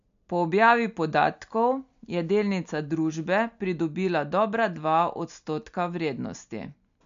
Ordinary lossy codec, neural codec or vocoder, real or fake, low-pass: MP3, 64 kbps; none; real; 7.2 kHz